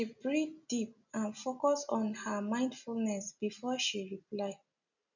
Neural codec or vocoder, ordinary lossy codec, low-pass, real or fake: none; none; 7.2 kHz; real